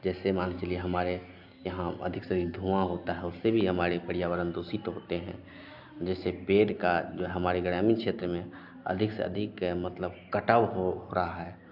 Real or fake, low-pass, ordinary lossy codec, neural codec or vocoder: real; 5.4 kHz; AAC, 48 kbps; none